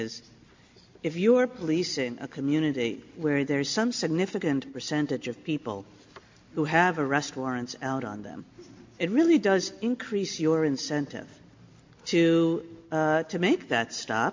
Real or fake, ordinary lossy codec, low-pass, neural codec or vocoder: real; MP3, 48 kbps; 7.2 kHz; none